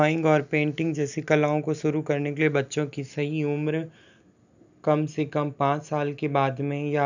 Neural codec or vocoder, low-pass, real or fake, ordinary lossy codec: none; 7.2 kHz; real; none